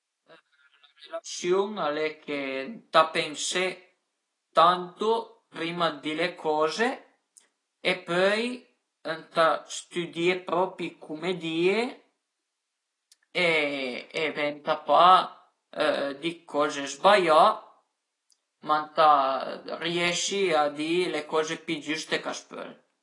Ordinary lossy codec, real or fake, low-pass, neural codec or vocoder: AAC, 32 kbps; real; 10.8 kHz; none